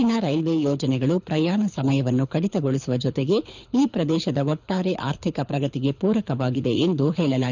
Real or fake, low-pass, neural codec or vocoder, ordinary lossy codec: fake; 7.2 kHz; codec, 24 kHz, 6 kbps, HILCodec; none